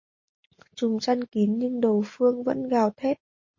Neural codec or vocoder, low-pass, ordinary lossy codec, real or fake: none; 7.2 kHz; MP3, 32 kbps; real